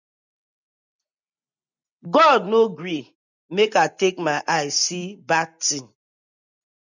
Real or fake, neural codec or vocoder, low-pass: real; none; 7.2 kHz